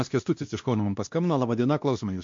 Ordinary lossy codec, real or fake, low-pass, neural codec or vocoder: MP3, 48 kbps; fake; 7.2 kHz; codec, 16 kHz, 1 kbps, X-Codec, WavLM features, trained on Multilingual LibriSpeech